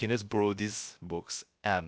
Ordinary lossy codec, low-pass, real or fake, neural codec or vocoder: none; none; fake; codec, 16 kHz, 0.3 kbps, FocalCodec